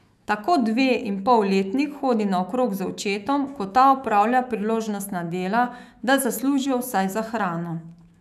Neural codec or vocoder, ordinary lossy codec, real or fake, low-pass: autoencoder, 48 kHz, 128 numbers a frame, DAC-VAE, trained on Japanese speech; none; fake; 14.4 kHz